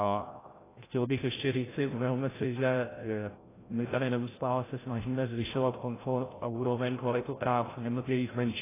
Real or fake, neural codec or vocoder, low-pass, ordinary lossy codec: fake; codec, 16 kHz, 0.5 kbps, FreqCodec, larger model; 3.6 kHz; AAC, 16 kbps